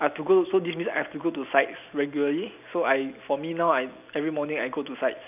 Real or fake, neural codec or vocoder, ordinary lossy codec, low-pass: real; none; none; 3.6 kHz